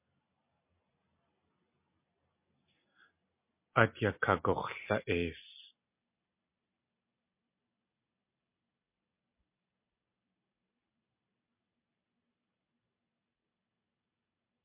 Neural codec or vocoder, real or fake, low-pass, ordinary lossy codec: none; real; 3.6 kHz; MP3, 24 kbps